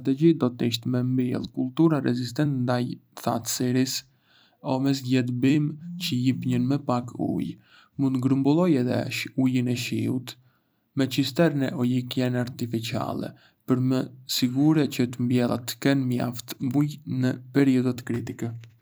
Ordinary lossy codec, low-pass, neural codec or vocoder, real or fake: none; none; vocoder, 44.1 kHz, 128 mel bands every 256 samples, BigVGAN v2; fake